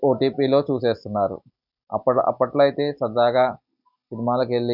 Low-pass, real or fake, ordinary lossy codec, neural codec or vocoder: 5.4 kHz; real; none; none